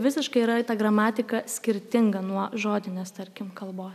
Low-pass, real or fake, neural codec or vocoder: 14.4 kHz; real; none